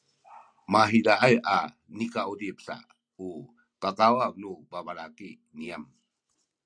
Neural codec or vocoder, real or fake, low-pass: none; real; 9.9 kHz